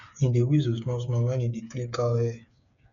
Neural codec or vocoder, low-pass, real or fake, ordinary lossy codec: codec, 16 kHz, 8 kbps, FreqCodec, smaller model; 7.2 kHz; fake; none